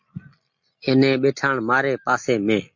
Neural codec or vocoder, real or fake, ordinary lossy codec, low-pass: none; real; MP3, 48 kbps; 7.2 kHz